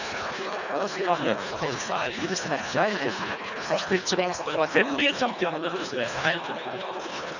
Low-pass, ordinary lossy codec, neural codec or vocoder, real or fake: 7.2 kHz; none; codec, 24 kHz, 1.5 kbps, HILCodec; fake